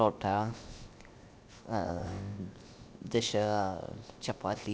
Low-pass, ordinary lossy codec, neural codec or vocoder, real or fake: none; none; codec, 16 kHz, 0.7 kbps, FocalCodec; fake